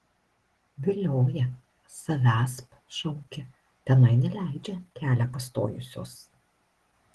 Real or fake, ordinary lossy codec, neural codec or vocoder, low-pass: real; Opus, 16 kbps; none; 10.8 kHz